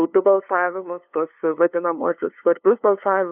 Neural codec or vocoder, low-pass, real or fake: codec, 16 kHz, 2 kbps, FunCodec, trained on LibriTTS, 25 frames a second; 3.6 kHz; fake